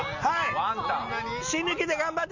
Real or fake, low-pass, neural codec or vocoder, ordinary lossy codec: real; 7.2 kHz; none; none